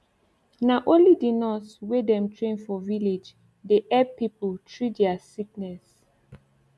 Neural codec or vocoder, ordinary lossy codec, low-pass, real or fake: none; none; none; real